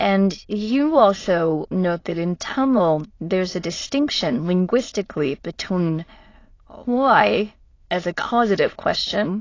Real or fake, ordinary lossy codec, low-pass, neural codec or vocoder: fake; AAC, 32 kbps; 7.2 kHz; autoencoder, 22.05 kHz, a latent of 192 numbers a frame, VITS, trained on many speakers